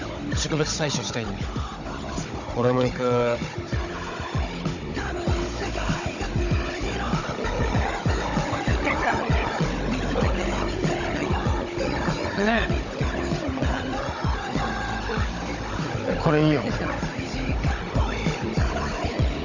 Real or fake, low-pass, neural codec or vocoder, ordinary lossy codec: fake; 7.2 kHz; codec, 16 kHz, 16 kbps, FunCodec, trained on Chinese and English, 50 frames a second; none